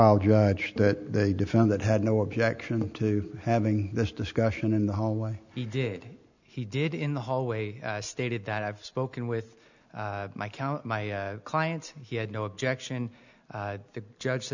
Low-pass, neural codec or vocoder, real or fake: 7.2 kHz; none; real